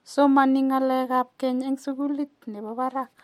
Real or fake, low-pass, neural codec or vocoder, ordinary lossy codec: real; 19.8 kHz; none; MP3, 64 kbps